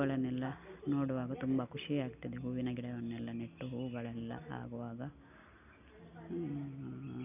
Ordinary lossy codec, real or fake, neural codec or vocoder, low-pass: none; real; none; 3.6 kHz